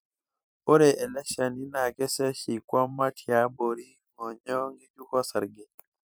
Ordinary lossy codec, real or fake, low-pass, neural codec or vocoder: none; fake; none; vocoder, 44.1 kHz, 128 mel bands every 256 samples, BigVGAN v2